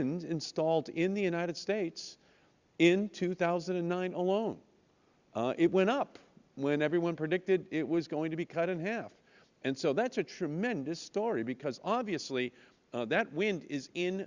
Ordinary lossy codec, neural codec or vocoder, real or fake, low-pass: Opus, 64 kbps; vocoder, 44.1 kHz, 128 mel bands every 256 samples, BigVGAN v2; fake; 7.2 kHz